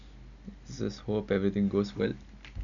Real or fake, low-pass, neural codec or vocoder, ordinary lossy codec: real; 7.2 kHz; none; none